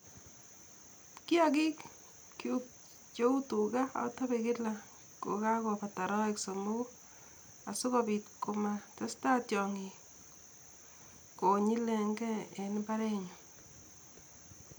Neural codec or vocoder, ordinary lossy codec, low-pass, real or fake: none; none; none; real